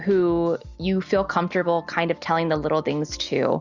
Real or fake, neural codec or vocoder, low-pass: real; none; 7.2 kHz